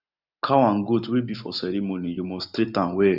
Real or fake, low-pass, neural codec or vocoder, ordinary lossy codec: real; 5.4 kHz; none; none